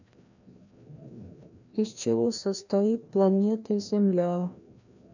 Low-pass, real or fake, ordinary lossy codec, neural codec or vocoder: 7.2 kHz; fake; none; codec, 16 kHz, 1 kbps, FreqCodec, larger model